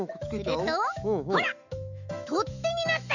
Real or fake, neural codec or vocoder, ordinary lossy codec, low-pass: fake; autoencoder, 48 kHz, 128 numbers a frame, DAC-VAE, trained on Japanese speech; none; 7.2 kHz